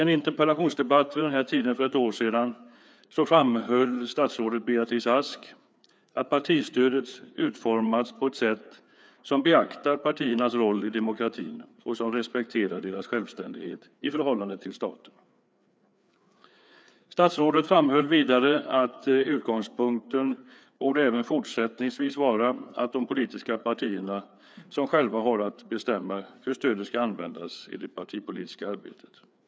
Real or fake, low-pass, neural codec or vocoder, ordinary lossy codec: fake; none; codec, 16 kHz, 4 kbps, FreqCodec, larger model; none